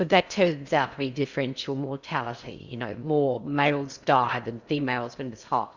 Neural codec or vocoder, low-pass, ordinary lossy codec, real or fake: codec, 16 kHz in and 24 kHz out, 0.6 kbps, FocalCodec, streaming, 4096 codes; 7.2 kHz; Opus, 64 kbps; fake